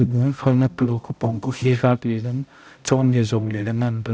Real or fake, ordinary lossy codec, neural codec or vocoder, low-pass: fake; none; codec, 16 kHz, 0.5 kbps, X-Codec, HuBERT features, trained on balanced general audio; none